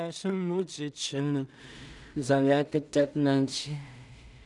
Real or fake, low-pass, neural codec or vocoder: fake; 10.8 kHz; codec, 16 kHz in and 24 kHz out, 0.4 kbps, LongCat-Audio-Codec, two codebook decoder